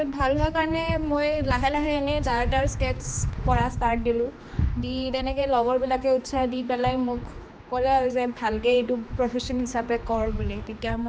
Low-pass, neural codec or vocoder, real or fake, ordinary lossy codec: none; codec, 16 kHz, 2 kbps, X-Codec, HuBERT features, trained on general audio; fake; none